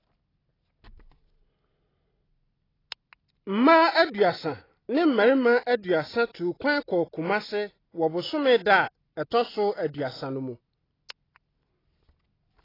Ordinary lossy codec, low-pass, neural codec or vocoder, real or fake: AAC, 24 kbps; 5.4 kHz; none; real